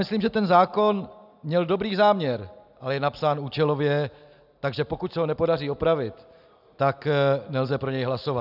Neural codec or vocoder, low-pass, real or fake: none; 5.4 kHz; real